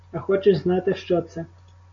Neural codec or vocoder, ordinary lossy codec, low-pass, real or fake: none; MP3, 48 kbps; 7.2 kHz; real